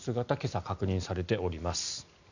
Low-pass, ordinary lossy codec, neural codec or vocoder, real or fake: 7.2 kHz; none; none; real